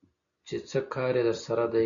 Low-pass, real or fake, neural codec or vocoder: 7.2 kHz; real; none